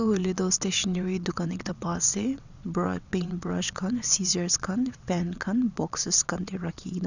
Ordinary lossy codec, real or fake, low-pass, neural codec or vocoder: none; fake; 7.2 kHz; vocoder, 22.05 kHz, 80 mel bands, WaveNeXt